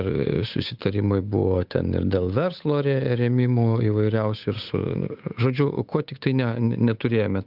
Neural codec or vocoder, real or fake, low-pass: codec, 16 kHz, 8 kbps, FunCodec, trained on Chinese and English, 25 frames a second; fake; 5.4 kHz